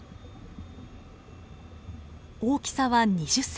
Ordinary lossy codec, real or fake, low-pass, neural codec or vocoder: none; real; none; none